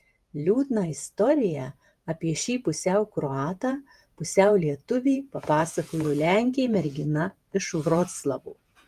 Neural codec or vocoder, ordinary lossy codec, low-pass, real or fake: vocoder, 48 kHz, 128 mel bands, Vocos; Opus, 32 kbps; 14.4 kHz; fake